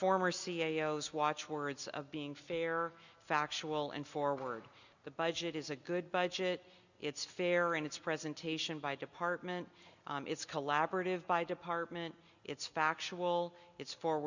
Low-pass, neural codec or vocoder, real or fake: 7.2 kHz; none; real